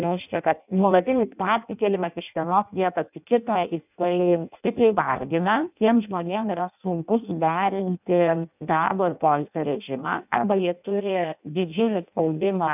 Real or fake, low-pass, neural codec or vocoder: fake; 3.6 kHz; codec, 16 kHz in and 24 kHz out, 0.6 kbps, FireRedTTS-2 codec